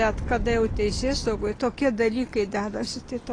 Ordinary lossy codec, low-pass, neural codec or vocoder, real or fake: AAC, 32 kbps; 9.9 kHz; none; real